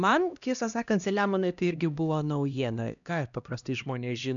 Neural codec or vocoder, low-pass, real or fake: codec, 16 kHz, 1 kbps, X-Codec, HuBERT features, trained on LibriSpeech; 7.2 kHz; fake